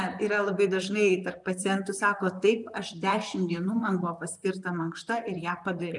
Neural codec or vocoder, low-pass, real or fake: vocoder, 44.1 kHz, 128 mel bands, Pupu-Vocoder; 10.8 kHz; fake